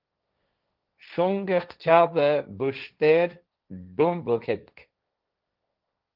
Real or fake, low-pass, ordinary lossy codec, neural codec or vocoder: fake; 5.4 kHz; Opus, 32 kbps; codec, 16 kHz, 1.1 kbps, Voila-Tokenizer